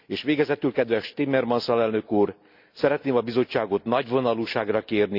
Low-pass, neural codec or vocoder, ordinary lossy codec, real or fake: 5.4 kHz; none; none; real